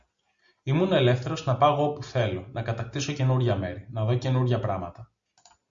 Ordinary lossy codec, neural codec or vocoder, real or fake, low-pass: Opus, 64 kbps; none; real; 7.2 kHz